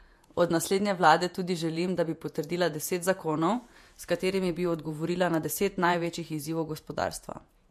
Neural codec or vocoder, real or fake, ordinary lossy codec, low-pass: vocoder, 48 kHz, 128 mel bands, Vocos; fake; MP3, 64 kbps; 14.4 kHz